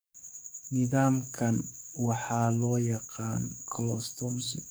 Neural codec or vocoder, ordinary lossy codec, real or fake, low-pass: codec, 44.1 kHz, 7.8 kbps, DAC; none; fake; none